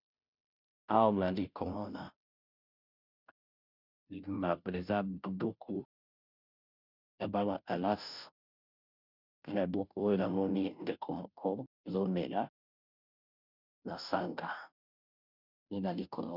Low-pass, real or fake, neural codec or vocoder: 5.4 kHz; fake; codec, 16 kHz, 0.5 kbps, FunCodec, trained on Chinese and English, 25 frames a second